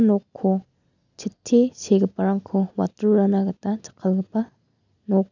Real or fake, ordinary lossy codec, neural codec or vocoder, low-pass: real; none; none; 7.2 kHz